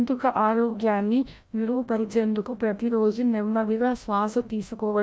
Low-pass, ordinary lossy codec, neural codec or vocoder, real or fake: none; none; codec, 16 kHz, 0.5 kbps, FreqCodec, larger model; fake